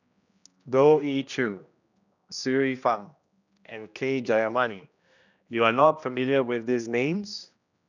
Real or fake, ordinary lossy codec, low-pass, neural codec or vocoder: fake; none; 7.2 kHz; codec, 16 kHz, 1 kbps, X-Codec, HuBERT features, trained on general audio